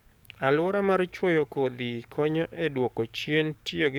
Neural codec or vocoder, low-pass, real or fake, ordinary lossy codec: codec, 44.1 kHz, 7.8 kbps, DAC; 19.8 kHz; fake; none